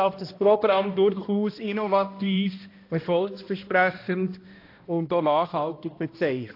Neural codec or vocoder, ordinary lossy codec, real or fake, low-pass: codec, 16 kHz, 1 kbps, X-Codec, HuBERT features, trained on balanced general audio; AAC, 32 kbps; fake; 5.4 kHz